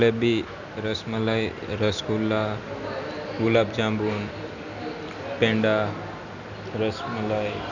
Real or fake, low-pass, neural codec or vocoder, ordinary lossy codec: real; 7.2 kHz; none; none